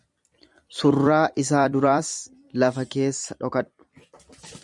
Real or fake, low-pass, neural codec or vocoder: fake; 10.8 kHz; vocoder, 44.1 kHz, 128 mel bands every 256 samples, BigVGAN v2